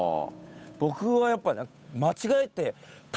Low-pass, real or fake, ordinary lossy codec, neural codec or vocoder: none; fake; none; codec, 16 kHz, 8 kbps, FunCodec, trained on Chinese and English, 25 frames a second